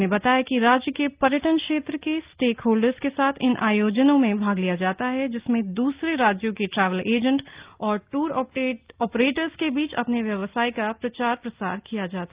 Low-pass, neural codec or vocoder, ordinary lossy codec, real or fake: 3.6 kHz; none; Opus, 32 kbps; real